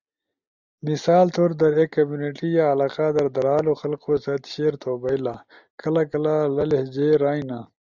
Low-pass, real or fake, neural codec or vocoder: 7.2 kHz; real; none